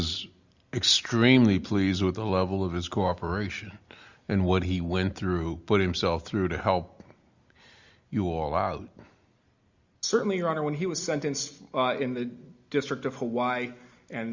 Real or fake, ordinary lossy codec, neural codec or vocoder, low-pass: real; Opus, 64 kbps; none; 7.2 kHz